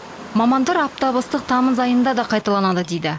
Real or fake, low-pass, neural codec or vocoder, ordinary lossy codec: real; none; none; none